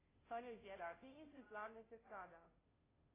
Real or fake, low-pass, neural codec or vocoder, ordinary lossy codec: fake; 3.6 kHz; codec, 16 kHz, 0.5 kbps, FunCodec, trained on Chinese and English, 25 frames a second; AAC, 16 kbps